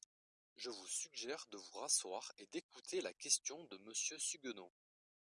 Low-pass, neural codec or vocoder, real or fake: 10.8 kHz; none; real